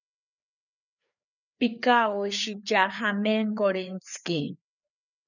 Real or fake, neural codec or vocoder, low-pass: fake; codec, 16 kHz, 4 kbps, FreqCodec, larger model; 7.2 kHz